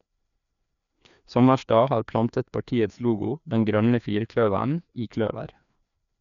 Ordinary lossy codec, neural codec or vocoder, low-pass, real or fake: none; codec, 16 kHz, 2 kbps, FreqCodec, larger model; 7.2 kHz; fake